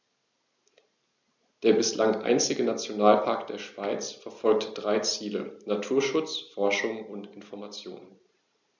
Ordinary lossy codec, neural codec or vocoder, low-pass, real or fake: none; none; 7.2 kHz; real